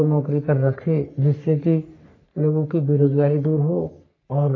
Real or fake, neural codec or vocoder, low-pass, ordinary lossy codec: fake; codec, 44.1 kHz, 3.4 kbps, Pupu-Codec; 7.2 kHz; AAC, 32 kbps